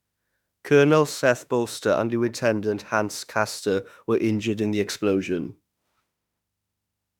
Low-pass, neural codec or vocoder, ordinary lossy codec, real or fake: 19.8 kHz; autoencoder, 48 kHz, 32 numbers a frame, DAC-VAE, trained on Japanese speech; none; fake